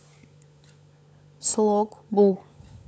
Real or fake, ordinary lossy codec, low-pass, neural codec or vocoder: fake; none; none; codec, 16 kHz, 16 kbps, FunCodec, trained on LibriTTS, 50 frames a second